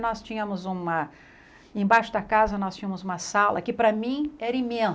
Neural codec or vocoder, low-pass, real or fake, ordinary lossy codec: none; none; real; none